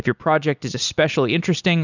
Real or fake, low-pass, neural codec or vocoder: real; 7.2 kHz; none